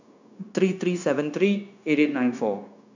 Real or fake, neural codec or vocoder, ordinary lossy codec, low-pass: fake; codec, 16 kHz, 0.9 kbps, LongCat-Audio-Codec; none; 7.2 kHz